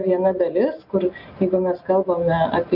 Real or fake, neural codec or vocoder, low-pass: real; none; 5.4 kHz